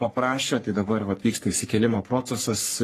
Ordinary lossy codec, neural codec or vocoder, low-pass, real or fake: AAC, 48 kbps; codec, 44.1 kHz, 3.4 kbps, Pupu-Codec; 14.4 kHz; fake